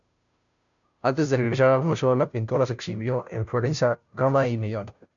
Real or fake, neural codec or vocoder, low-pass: fake; codec, 16 kHz, 0.5 kbps, FunCodec, trained on Chinese and English, 25 frames a second; 7.2 kHz